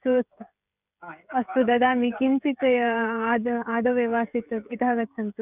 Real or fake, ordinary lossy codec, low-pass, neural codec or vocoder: fake; none; 3.6 kHz; codec, 16 kHz, 16 kbps, FreqCodec, smaller model